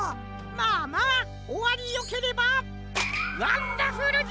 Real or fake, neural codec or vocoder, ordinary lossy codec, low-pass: real; none; none; none